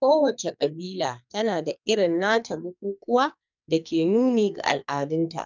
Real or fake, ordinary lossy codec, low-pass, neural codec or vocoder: fake; none; 7.2 kHz; codec, 44.1 kHz, 2.6 kbps, SNAC